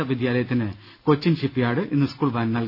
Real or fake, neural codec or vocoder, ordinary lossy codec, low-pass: real; none; none; 5.4 kHz